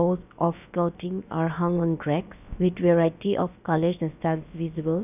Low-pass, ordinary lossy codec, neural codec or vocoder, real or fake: 3.6 kHz; none; codec, 16 kHz, about 1 kbps, DyCAST, with the encoder's durations; fake